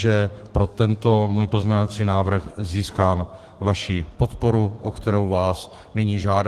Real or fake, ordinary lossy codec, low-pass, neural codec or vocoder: fake; Opus, 24 kbps; 14.4 kHz; codec, 32 kHz, 1.9 kbps, SNAC